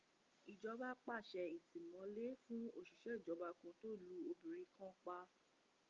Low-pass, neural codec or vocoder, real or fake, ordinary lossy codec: 7.2 kHz; none; real; Opus, 32 kbps